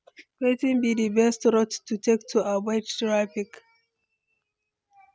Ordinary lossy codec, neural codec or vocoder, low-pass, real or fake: none; none; none; real